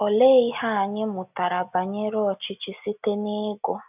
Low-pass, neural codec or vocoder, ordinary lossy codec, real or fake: 3.6 kHz; none; none; real